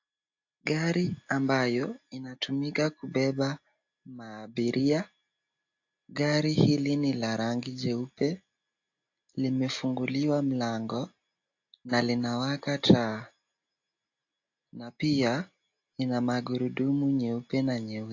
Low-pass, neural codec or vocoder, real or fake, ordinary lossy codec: 7.2 kHz; none; real; AAC, 48 kbps